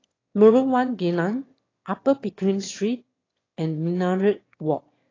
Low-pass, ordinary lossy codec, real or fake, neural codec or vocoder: 7.2 kHz; AAC, 32 kbps; fake; autoencoder, 22.05 kHz, a latent of 192 numbers a frame, VITS, trained on one speaker